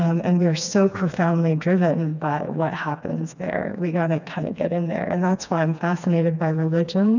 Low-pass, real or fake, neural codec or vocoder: 7.2 kHz; fake; codec, 16 kHz, 2 kbps, FreqCodec, smaller model